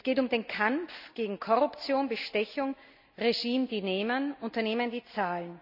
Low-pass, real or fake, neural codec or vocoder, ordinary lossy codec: 5.4 kHz; real; none; none